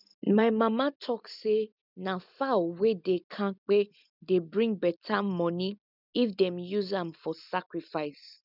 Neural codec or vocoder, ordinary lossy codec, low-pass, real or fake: none; none; 5.4 kHz; real